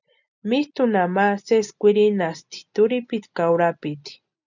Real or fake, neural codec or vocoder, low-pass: real; none; 7.2 kHz